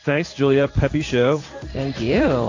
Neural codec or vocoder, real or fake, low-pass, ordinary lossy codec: codec, 16 kHz in and 24 kHz out, 1 kbps, XY-Tokenizer; fake; 7.2 kHz; AAC, 48 kbps